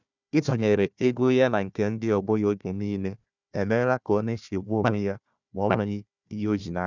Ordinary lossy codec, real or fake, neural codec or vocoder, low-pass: none; fake; codec, 16 kHz, 1 kbps, FunCodec, trained on Chinese and English, 50 frames a second; 7.2 kHz